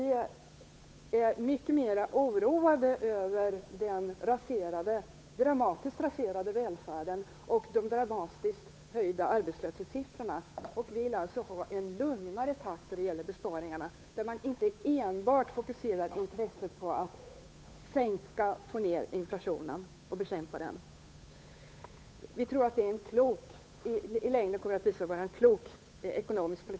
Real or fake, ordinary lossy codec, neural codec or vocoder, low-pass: fake; none; codec, 16 kHz, 8 kbps, FunCodec, trained on Chinese and English, 25 frames a second; none